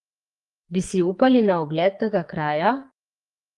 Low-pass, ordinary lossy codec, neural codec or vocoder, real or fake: 10.8 kHz; Opus, 32 kbps; codec, 44.1 kHz, 2.6 kbps, SNAC; fake